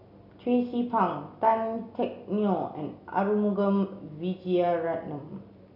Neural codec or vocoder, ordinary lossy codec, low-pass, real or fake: none; none; 5.4 kHz; real